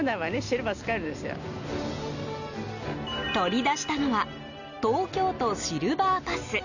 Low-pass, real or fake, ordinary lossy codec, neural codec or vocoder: 7.2 kHz; real; none; none